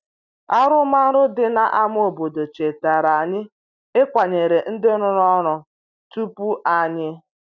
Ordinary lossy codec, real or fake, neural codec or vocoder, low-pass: none; real; none; 7.2 kHz